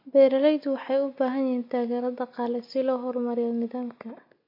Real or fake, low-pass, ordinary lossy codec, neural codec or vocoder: real; 5.4 kHz; MP3, 32 kbps; none